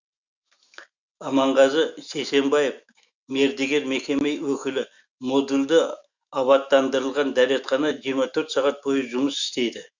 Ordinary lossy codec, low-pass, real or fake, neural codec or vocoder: Opus, 64 kbps; 7.2 kHz; fake; autoencoder, 48 kHz, 128 numbers a frame, DAC-VAE, trained on Japanese speech